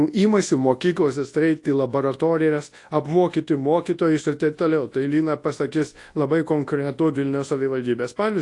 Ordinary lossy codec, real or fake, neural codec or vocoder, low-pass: AAC, 48 kbps; fake; codec, 24 kHz, 0.9 kbps, WavTokenizer, large speech release; 10.8 kHz